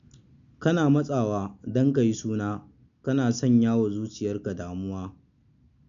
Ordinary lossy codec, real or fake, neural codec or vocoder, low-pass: none; real; none; 7.2 kHz